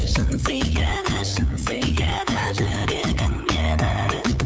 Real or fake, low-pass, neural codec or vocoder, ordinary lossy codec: fake; none; codec, 16 kHz, 8 kbps, FunCodec, trained on LibriTTS, 25 frames a second; none